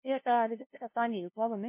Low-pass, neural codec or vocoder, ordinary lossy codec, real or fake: 3.6 kHz; codec, 16 kHz, 0.5 kbps, FunCodec, trained on LibriTTS, 25 frames a second; MP3, 24 kbps; fake